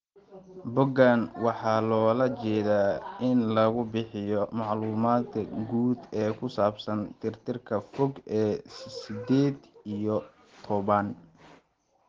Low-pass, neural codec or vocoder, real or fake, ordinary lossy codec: 7.2 kHz; none; real; Opus, 16 kbps